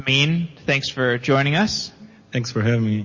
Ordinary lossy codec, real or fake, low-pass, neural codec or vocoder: MP3, 32 kbps; real; 7.2 kHz; none